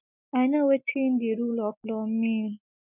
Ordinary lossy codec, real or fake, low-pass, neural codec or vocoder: none; real; 3.6 kHz; none